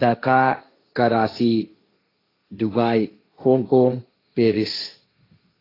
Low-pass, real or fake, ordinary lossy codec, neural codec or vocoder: 5.4 kHz; fake; AAC, 24 kbps; codec, 16 kHz, 1.1 kbps, Voila-Tokenizer